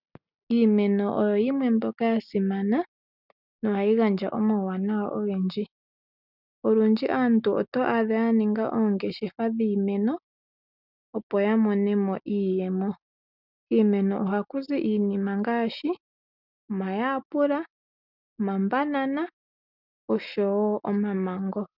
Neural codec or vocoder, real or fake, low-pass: none; real; 5.4 kHz